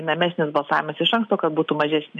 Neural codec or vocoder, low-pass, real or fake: none; 10.8 kHz; real